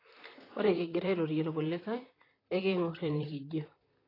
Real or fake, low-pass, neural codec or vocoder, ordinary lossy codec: fake; 5.4 kHz; vocoder, 44.1 kHz, 128 mel bands, Pupu-Vocoder; AAC, 24 kbps